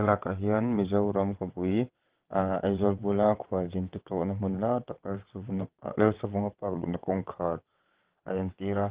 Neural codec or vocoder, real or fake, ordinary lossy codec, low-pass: vocoder, 22.05 kHz, 80 mel bands, WaveNeXt; fake; Opus, 16 kbps; 3.6 kHz